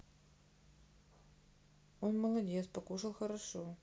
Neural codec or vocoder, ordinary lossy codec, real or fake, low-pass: none; none; real; none